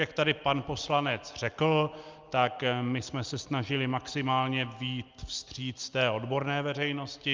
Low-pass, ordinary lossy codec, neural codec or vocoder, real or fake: 7.2 kHz; Opus, 24 kbps; none; real